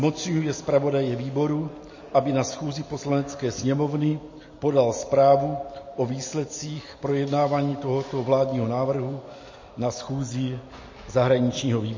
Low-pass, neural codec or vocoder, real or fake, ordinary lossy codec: 7.2 kHz; none; real; MP3, 32 kbps